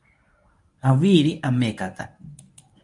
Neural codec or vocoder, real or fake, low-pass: codec, 24 kHz, 0.9 kbps, WavTokenizer, medium speech release version 1; fake; 10.8 kHz